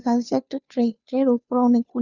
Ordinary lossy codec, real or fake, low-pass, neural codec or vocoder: none; fake; 7.2 kHz; codec, 16 kHz, 2 kbps, FunCodec, trained on Chinese and English, 25 frames a second